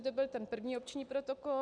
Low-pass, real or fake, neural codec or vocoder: 9.9 kHz; real; none